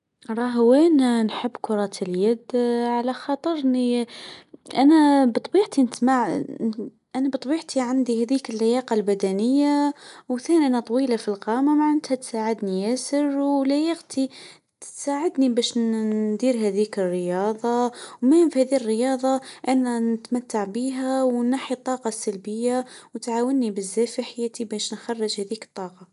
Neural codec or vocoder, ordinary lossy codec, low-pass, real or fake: none; none; 10.8 kHz; real